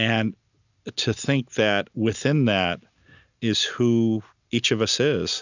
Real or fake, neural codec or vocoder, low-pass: real; none; 7.2 kHz